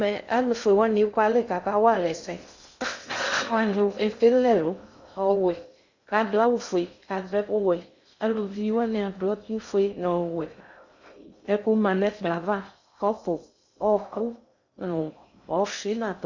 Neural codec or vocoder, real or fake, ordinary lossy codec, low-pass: codec, 16 kHz in and 24 kHz out, 0.6 kbps, FocalCodec, streaming, 2048 codes; fake; Opus, 64 kbps; 7.2 kHz